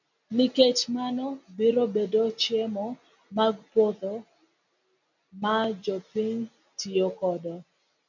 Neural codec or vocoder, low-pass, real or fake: none; 7.2 kHz; real